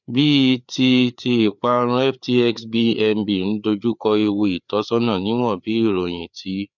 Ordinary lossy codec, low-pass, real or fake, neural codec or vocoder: none; 7.2 kHz; fake; codec, 16 kHz, 4 kbps, FreqCodec, larger model